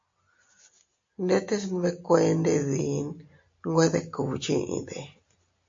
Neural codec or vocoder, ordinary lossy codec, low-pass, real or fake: none; MP3, 48 kbps; 7.2 kHz; real